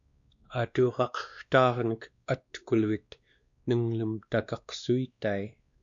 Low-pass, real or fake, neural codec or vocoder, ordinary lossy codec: 7.2 kHz; fake; codec, 16 kHz, 2 kbps, X-Codec, WavLM features, trained on Multilingual LibriSpeech; Opus, 64 kbps